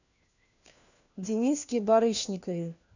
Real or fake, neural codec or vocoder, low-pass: fake; codec, 16 kHz, 1 kbps, FunCodec, trained on LibriTTS, 50 frames a second; 7.2 kHz